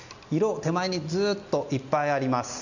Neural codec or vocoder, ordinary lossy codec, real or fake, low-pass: none; none; real; 7.2 kHz